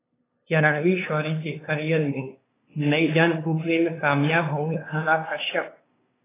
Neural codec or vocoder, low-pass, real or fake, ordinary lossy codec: codec, 16 kHz, 2 kbps, FunCodec, trained on LibriTTS, 25 frames a second; 3.6 kHz; fake; AAC, 16 kbps